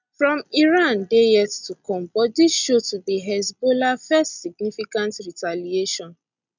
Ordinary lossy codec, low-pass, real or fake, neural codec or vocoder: none; 7.2 kHz; real; none